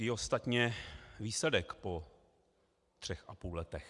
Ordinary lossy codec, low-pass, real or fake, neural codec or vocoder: Opus, 64 kbps; 10.8 kHz; real; none